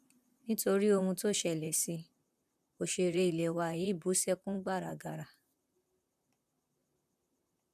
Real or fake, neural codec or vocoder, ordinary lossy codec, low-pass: fake; vocoder, 44.1 kHz, 128 mel bands, Pupu-Vocoder; none; 14.4 kHz